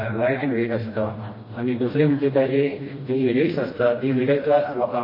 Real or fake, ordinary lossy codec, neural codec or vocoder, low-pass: fake; MP3, 24 kbps; codec, 16 kHz, 1 kbps, FreqCodec, smaller model; 5.4 kHz